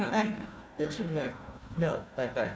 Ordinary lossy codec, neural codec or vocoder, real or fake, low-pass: none; codec, 16 kHz, 1 kbps, FunCodec, trained on Chinese and English, 50 frames a second; fake; none